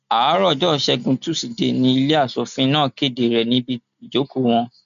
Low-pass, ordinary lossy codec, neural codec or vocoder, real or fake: 7.2 kHz; none; none; real